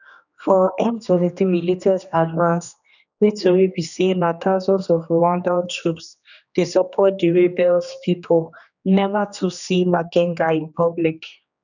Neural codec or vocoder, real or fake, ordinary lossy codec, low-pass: codec, 16 kHz, 2 kbps, X-Codec, HuBERT features, trained on general audio; fake; none; 7.2 kHz